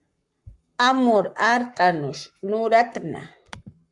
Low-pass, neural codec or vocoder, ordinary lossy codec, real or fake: 10.8 kHz; codec, 44.1 kHz, 7.8 kbps, Pupu-Codec; MP3, 96 kbps; fake